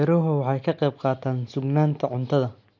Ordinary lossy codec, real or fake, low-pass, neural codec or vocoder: MP3, 48 kbps; real; 7.2 kHz; none